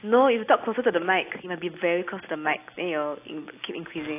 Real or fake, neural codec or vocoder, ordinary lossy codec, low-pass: real; none; AAC, 24 kbps; 3.6 kHz